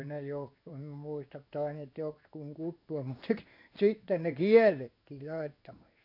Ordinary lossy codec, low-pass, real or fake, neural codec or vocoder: none; 5.4 kHz; fake; codec, 16 kHz in and 24 kHz out, 1 kbps, XY-Tokenizer